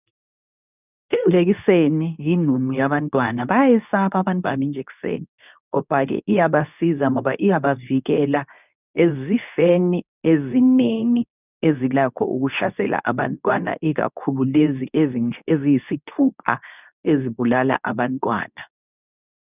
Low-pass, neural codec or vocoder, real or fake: 3.6 kHz; codec, 24 kHz, 0.9 kbps, WavTokenizer, medium speech release version 2; fake